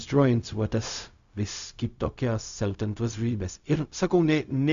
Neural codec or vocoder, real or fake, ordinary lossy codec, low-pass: codec, 16 kHz, 0.4 kbps, LongCat-Audio-Codec; fake; Opus, 64 kbps; 7.2 kHz